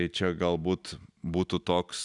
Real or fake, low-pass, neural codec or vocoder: real; 10.8 kHz; none